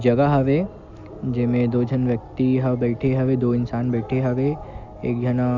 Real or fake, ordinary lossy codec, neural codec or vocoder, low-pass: real; none; none; 7.2 kHz